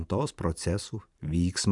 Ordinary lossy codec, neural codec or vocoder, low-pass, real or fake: MP3, 96 kbps; vocoder, 44.1 kHz, 128 mel bands every 512 samples, BigVGAN v2; 10.8 kHz; fake